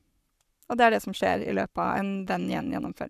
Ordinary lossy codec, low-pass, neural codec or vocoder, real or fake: none; 14.4 kHz; codec, 44.1 kHz, 7.8 kbps, Pupu-Codec; fake